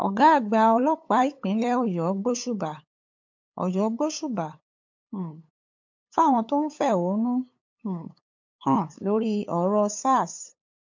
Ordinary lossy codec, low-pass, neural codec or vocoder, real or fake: MP3, 48 kbps; 7.2 kHz; codec, 16 kHz, 8 kbps, FunCodec, trained on LibriTTS, 25 frames a second; fake